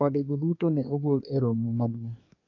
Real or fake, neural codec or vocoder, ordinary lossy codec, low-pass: fake; codec, 24 kHz, 1 kbps, SNAC; AAC, 48 kbps; 7.2 kHz